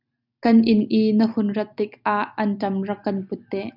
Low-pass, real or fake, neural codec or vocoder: 5.4 kHz; real; none